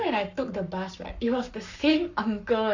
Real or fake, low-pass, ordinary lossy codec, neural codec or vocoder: fake; 7.2 kHz; none; codec, 44.1 kHz, 7.8 kbps, Pupu-Codec